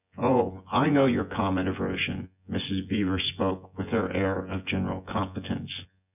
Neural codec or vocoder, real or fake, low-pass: vocoder, 24 kHz, 100 mel bands, Vocos; fake; 3.6 kHz